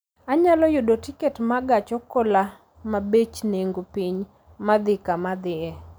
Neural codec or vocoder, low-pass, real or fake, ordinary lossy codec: none; none; real; none